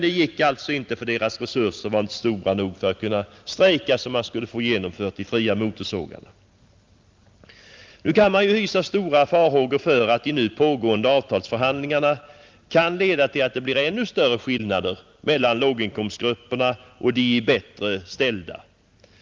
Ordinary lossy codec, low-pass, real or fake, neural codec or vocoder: Opus, 32 kbps; 7.2 kHz; real; none